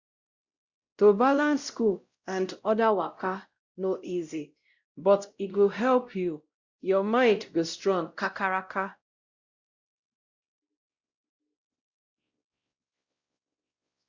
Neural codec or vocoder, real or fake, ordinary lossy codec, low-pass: codec, 16 kHz, 0.5 kbps, X-Codec, WavLM features, trained on Multilingual LibriSpeech; fake; Opus, 64 kbps; 7.2 kHz